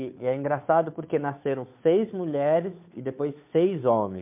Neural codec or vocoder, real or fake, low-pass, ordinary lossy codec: codec, 16 kHz, 2 kbps, FunCodec, trained on Chinese and English, 25 frames a second; fake; 3.6 kHz; MP3, 32 kbps